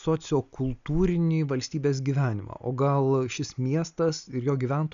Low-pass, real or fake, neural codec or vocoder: 7.2 kHz; real; none